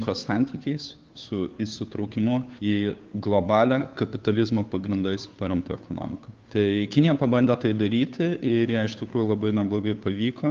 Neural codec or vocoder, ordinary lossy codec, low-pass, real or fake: codec, 16 kHz, 2 kbps, FunCodec, trained on Chinese and English, 25 frames a second; Opus, 32 kbps; 7.2 kHz; fake